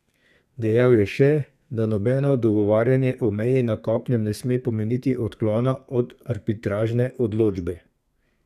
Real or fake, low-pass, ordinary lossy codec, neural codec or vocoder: fake; 14.4 kHz; none; codec, 32 kHz, 1.9 kbps, SNAC